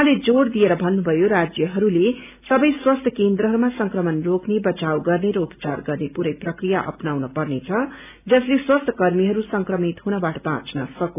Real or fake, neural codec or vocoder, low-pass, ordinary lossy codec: real; none; 3.6 kHz; none